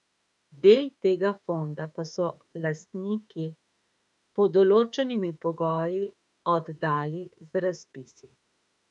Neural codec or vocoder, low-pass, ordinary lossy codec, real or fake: autoencoder, 48 kHz, 32 numbers a frame, DAC-VAE, trained on Japanese speech; 10.8 kHz; none; fake